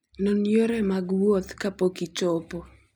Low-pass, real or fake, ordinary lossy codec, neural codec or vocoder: 19.8 kHz; real; none; none